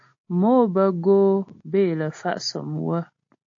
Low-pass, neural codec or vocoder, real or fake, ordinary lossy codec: 7.2 kHz; none; real; AAC, 48 kbps